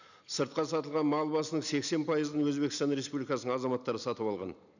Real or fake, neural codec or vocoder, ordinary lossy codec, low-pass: real; none; none; 7.2 kHz